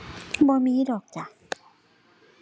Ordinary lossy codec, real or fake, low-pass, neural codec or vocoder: none; real; none; none